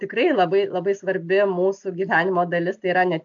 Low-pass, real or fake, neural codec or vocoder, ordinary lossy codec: 7.2 kHz; real; none; AAC, 64 kbps